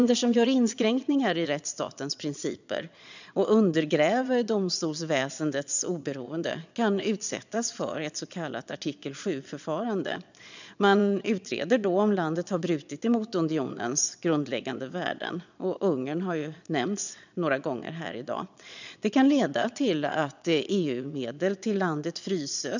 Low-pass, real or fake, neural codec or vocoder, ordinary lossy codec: 7.2 kHz; fake; vocoder, 22.05 kHz, 80 mel bands, Vocos; none